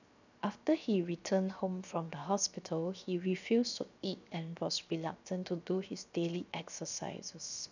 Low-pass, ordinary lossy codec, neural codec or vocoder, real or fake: 7.2 kHz; none; codec, 16 kHz, 0.7 kbps, FocalCodec; fake